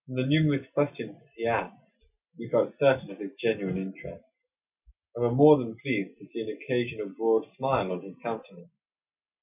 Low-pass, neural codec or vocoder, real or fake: 3.6 kHz; none; real